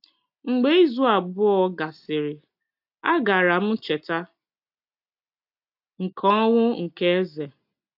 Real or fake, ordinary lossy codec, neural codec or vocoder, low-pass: real; AAC, 48 kbps; none; 5.4 kHz